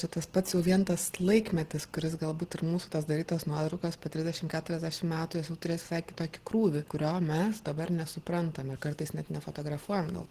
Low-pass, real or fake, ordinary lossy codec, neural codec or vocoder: 14.4 kHz; real; Opus, 16 kbps; none